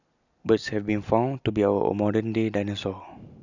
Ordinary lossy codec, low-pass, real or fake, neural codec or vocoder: none; 7.2 kHz; real; none